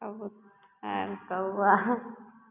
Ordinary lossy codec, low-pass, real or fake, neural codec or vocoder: none; 3.6 kHz; real; none